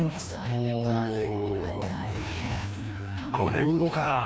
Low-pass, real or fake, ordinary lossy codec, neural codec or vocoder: none; fake; none; codec, 16 kHz, 1 kbps, FreqCodec, larger model